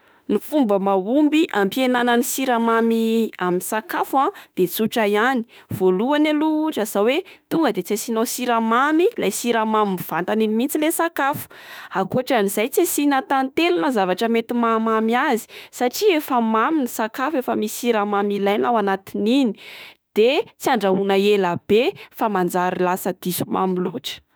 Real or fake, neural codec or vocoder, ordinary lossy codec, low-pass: fake; autoencoder, 48 kHz, 32 numbers a frame, DAC-VAE, trained on Japanese speech; none; none